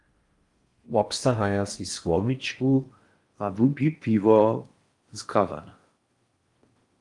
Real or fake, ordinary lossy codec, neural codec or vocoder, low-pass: fake; Opus, 32 kbps; codec, 16 kHz in and 24 kHz out, 0.6 kbps, FocalCodec, streaming, 4096 codes; 10.8 kHz